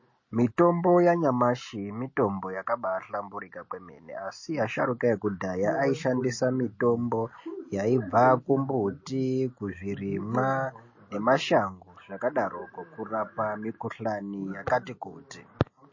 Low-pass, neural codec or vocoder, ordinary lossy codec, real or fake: 7.2 kHz; none; MP3, 32 kbps; real